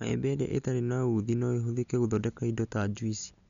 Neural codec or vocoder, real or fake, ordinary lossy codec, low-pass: none; real; none; 7.2 kHz